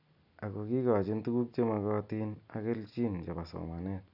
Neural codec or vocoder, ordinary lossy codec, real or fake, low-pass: none; none; real; 5.4 kHz